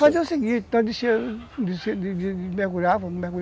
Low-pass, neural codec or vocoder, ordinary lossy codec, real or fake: none; none; none; real